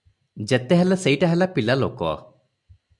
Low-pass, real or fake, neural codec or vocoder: 10.8 kHz; real; none